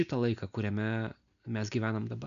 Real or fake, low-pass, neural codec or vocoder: real; 7.2 kHz; none